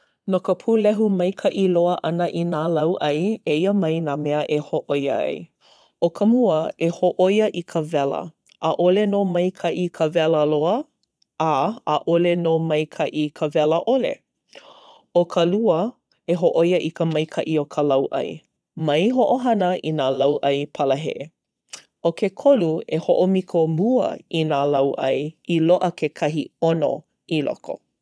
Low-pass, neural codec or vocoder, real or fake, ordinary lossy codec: none; vocoder, 22.05 kHz, 80 mel bands, Vocos; fake; none